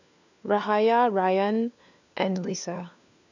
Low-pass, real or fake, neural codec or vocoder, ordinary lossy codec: 7.2 kHz; fake; codec, 16 kHz, 4 kbps, FunCodec, trained on LibriTTS, 50 frames a second; none